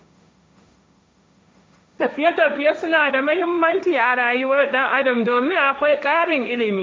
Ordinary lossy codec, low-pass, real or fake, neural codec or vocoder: none; none; fake; codec, 16 kHz, 1.1 kbps, Voila-Tokenizer